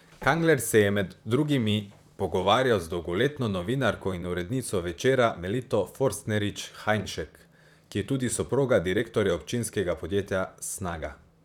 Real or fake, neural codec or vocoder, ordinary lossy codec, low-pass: fake; vocoder, 44.1 kHz, 128 mel bands, Pupu-Vocoder; none; 19.8 kHz